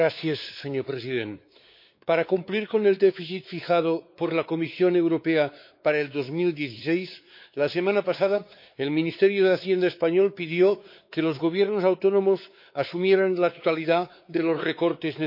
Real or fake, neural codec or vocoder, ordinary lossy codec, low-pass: fake; codec, 16 kHz, 4 kbps, X-Codec, WavLM features, trained on Multilingual LibriSpeech; MP3, 32 kbps; 5.4 kHz